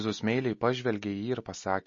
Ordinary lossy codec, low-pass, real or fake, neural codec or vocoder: MP3, 32 kbps; 7.2 kHz; real; none